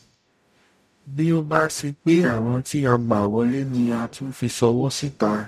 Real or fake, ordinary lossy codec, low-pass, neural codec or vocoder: fake; none; 14.4 kHz; codec, 44.1 kHz, 0.9 kbps, DAC